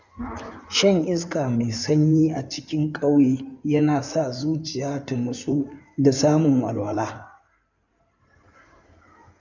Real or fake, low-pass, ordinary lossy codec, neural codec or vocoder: fake; 7.2 kHz; none; codec, 16 kHz in and 24 kHz out, 2.2 kbps, FireRedTTS-2 codec